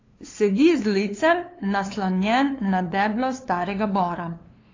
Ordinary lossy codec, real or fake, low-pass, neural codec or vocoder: AAC, 32 kbps; fake; 7.2 kHz; codec, 16 kHz, 2 kbps, FunCodec, trained on LibriTTS, 25 frames a second